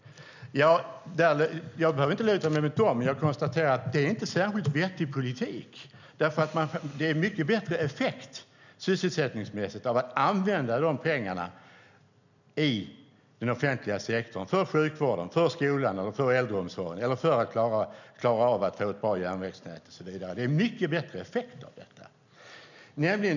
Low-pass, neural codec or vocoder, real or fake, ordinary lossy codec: 7.2 kHz; none; real; none